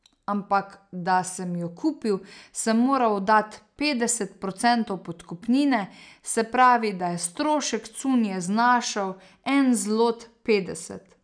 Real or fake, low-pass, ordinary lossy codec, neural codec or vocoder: real; 9.9 kHz; none; none